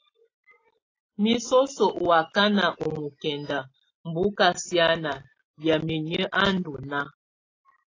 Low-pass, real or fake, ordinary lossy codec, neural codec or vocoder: 7.2 kHz; real; AAC, 32 kbps; none